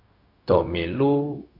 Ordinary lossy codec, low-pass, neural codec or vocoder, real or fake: AAC, 32 kbps; 5.4 kHz; codec, 16 kHz, 0.4 kbps, LongCat-Audio-Codec; fake